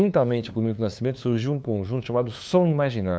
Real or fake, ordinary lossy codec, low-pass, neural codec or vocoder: fake; none; none; codec, 16 kHz, 2 kbps, FunCodec, trained on LibriTTS, 25 frames a second